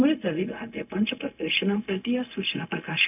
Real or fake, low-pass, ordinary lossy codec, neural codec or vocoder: fake; 3.6 kHz; none; codec, 16 kHz, 0.4 kbps, LongCat-Audio-Codec